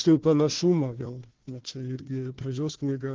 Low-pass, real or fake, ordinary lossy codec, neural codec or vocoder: 7.2 kHz; fake; Opus, 16 kbps; codec, 16 kHz, 1 kbps, FunCodec, trained on Chinese and English, 50 frames a second